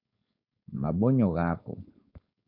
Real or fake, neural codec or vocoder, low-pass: fake; codec, 16 kHz, 4.8 kbps, FACodec; 5.4 kHz